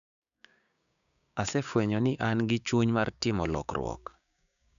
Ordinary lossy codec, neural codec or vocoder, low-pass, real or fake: none; codec, 16 kHz, 6 kbps, DAC; 7.2 kHz; fake